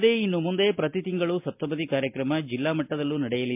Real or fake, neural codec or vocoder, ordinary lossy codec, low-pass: real; none; MP3, 32 kbps; 3.6 kHz